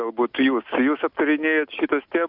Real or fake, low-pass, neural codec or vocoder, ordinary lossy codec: real; 5.4 kHz; none; AAC, 48 kbps